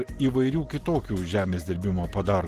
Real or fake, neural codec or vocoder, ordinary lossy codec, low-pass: real; none; Opus, 16 kbps; 14.4 kHz